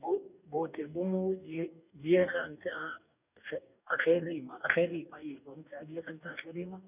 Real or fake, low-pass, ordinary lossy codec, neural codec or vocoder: fake; 3.6 kHz; none; codec, 44.1 kHz, 2.6 kbps, DAC